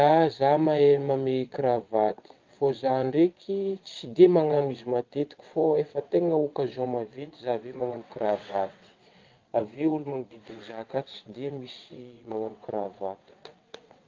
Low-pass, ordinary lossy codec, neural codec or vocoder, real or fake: 7.2 kHz; Opus, 24 kbps; vocoder, 22.05 kHz, 80 mel bands, WaveNeXt; fake